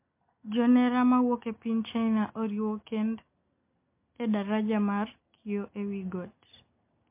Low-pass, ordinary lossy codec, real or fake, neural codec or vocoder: 3.6 kHz; MP3, 24 kbps; real; none